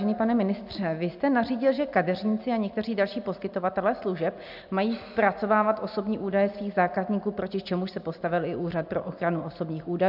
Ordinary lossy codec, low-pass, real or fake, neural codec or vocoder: AAC, 48 kbps; 5.4 kHz; real; none